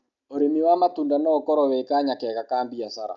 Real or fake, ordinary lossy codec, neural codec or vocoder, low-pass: real; none; none; 7.2 kHz